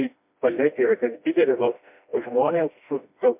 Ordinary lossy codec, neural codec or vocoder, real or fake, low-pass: MP3, 32 kbps; codec, 16 kHz, 1 kbps, FreqCodec, smaller model; fake; 3.6 kHz